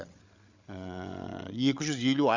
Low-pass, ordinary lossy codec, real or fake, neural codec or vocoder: 7.2 kHz; Opus, 64 kbps; fake; codec, 16 kHz, 16 kbps, FreqCodec, larger model